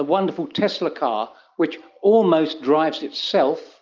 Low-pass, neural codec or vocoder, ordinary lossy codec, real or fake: 7.2 kHz; none; Opus, 32 kbps; real